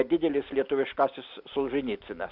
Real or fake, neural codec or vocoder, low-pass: real; none; 5.4 kHz